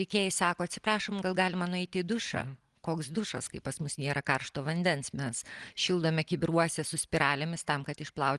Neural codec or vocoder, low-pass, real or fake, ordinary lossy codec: none; 10.8 kHz; real; Opus, 24 kbps